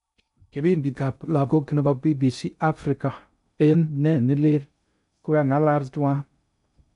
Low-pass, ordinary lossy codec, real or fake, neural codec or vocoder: 10.8 kHz; none; fake; codec, 16 kHz in and 24 kHz out, 0.6 kbps, FocalCodec, streaming, 2048 codes